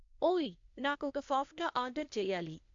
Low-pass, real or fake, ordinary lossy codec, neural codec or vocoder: 7.2 kHz; fake; MP3, 64 kbps; codec, 16 kHz, 0.8 kbps, ZipCodec